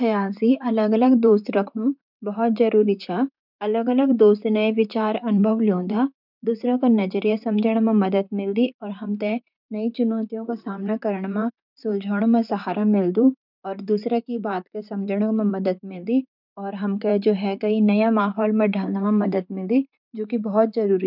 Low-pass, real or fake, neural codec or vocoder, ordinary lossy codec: 5.4 kHz; fake; vocoder, 44.1 kHz, 128 mel bands, Pupu-Vocoder; none